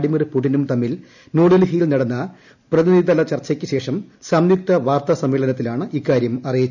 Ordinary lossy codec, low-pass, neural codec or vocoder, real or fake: none; 7.2 kHz; none; real